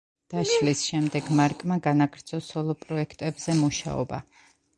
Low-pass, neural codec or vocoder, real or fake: 10.8 kHz; none; real